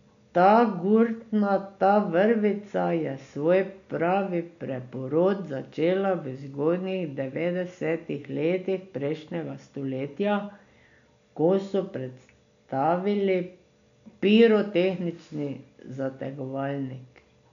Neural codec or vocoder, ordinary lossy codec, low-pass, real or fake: none; none; 7.2 kHz; real